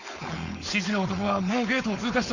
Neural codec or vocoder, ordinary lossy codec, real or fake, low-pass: codec, 16 kHz, 4.8 kbps, FACodec; Opus, 64 kbps; fake; 7.2 kHz